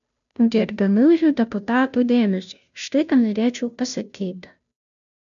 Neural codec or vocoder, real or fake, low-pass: codec, 16 kHz, 0.5 kbps, FunCodec, trained on Chinese and English, 25 frames a second; fake; 7.2 kHz